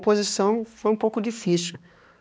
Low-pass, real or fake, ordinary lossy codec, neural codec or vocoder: none; fake; none; codec, 16 kHz, 2 kbps, X-Codec, HuBERT features, trained on balanced general audio